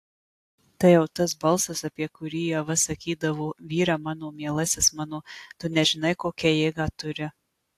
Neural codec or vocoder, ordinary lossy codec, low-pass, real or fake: none; AAC, 64 kbps; 14.4 kHz; real